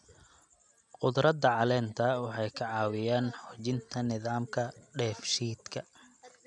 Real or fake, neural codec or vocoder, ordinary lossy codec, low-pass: real; none; none; 10.8 kHz